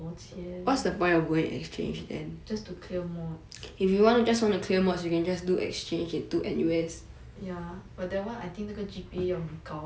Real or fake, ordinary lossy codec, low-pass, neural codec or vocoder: real; none; none; none